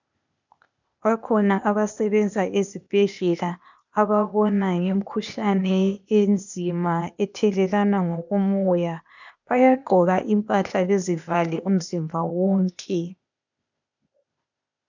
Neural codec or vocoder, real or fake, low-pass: codec, 16 kHz, 0.8 kbps, ZipCodec; fake; 7.2 kHz